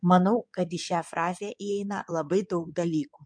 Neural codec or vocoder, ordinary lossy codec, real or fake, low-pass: codec, 16 kHz in and 24 kHz out, 2.2 kbps, FireRedTTS-2 codec; MP3, 64 kbps; fake; 9.9 kHz